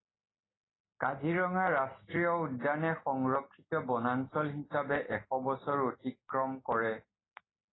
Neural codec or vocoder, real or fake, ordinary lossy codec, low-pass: none; real; AAC, 16 kbps; 7.2 kHz